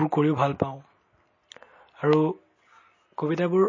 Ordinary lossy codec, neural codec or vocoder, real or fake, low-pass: MP3, 32 kbps; none; real; 7.2 kHz